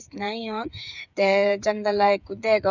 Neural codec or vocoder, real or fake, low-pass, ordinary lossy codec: codec, 16 kHz, 8 kbps, FreqCodec, smaller model; fake; 7.2 kHz; none